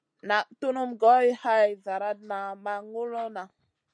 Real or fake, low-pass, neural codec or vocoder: real; 9.9 kHz; none